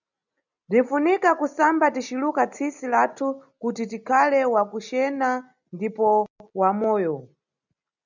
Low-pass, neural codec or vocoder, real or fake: 7.2 kHz; none; real